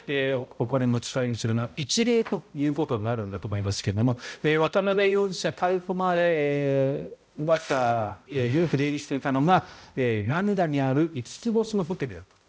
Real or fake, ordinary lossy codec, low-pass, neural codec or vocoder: fake; none; none; codec, 16 kHz, 0.5 kbps, X-Codec, HuBERT features, trained on balanced general audio